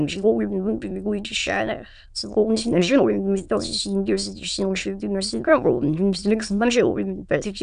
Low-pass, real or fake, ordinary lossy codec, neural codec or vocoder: 9.9 kHz; fake; MP3, 96 kbps; autoencoder, 22.05 kHz, a latent of 192 numbers a frame, VITS, trained on many speakers